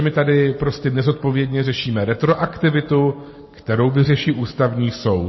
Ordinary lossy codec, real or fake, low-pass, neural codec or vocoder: MP3, 24 kbps; real; 7.2 kHz; none